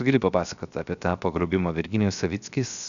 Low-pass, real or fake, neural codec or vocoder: 7.2 kHz; fake; codec, 16 kHz, 0.7 kbps, FocalCodec